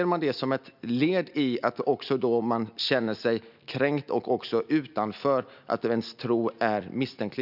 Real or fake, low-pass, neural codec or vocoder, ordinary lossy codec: real; 5.4 kHz; none; none